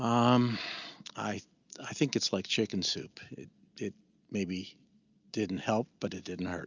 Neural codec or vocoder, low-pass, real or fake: none; 7.2 kHz; real